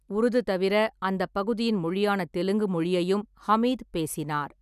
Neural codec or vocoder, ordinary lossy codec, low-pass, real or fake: none; none; 14.4 kHz; real